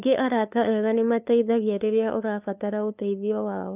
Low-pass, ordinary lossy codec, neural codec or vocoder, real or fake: 3.6 kHz; none; codec, 16 kHz, 2 kbps, FunCodec, trained on LibriTTS, 25 frames a second; fake